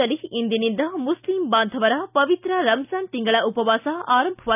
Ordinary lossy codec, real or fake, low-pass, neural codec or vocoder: none; real; 3.6 kHz; none